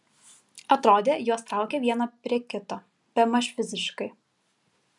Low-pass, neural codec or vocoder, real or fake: 10.8 kHz; none; real